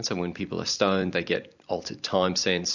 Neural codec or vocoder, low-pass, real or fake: vocoder, 44.1 kHz, 128 mel bands every 256 samples, BigVGAN v2; 7.2 kHz; fake